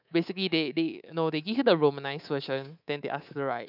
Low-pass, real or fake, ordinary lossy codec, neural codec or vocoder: 5.4 kHz; fake; none; codec, 24 kHz, 3.1 kbps, DualCodec